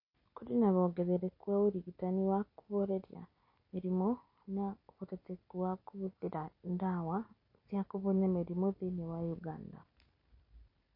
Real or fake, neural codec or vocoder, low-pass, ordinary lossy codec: real; none; 5.4 kHz; MP3, 32 kbps